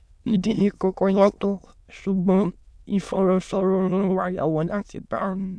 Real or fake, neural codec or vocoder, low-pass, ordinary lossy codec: fake; autoencoder, 22.05 kHz, a latent of 192 numbers a frame, VITS, trained on many speakers; none; none